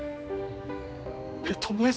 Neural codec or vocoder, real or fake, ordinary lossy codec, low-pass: codec, 16 kHz, 2 kbps, X-Codec, HuBERT features, trained on general audio; fake; none; none